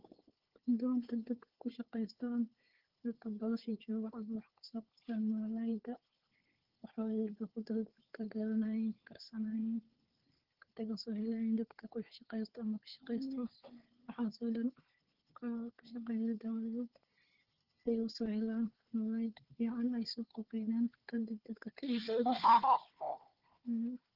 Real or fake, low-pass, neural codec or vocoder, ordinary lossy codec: fake; 5.4 kHz; codec, 16 kHz, 4 kbps, FunCodec, trained on Chinese and English, 50 frames a second; Opus, 16 kbps